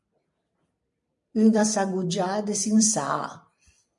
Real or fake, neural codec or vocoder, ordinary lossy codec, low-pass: fake; vocoder, 44.1 kHz, 128 mel bands every 256 samples, BigVGAN v2; MP3, 64 kbps; 10.8 kHz